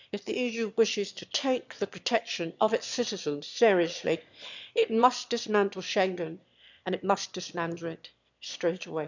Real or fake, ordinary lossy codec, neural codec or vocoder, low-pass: fake; none; autoencoder, 22.05 kHz, a latent of 192 numbers a frame, VITS, trained on one speaker; 7.2 kHz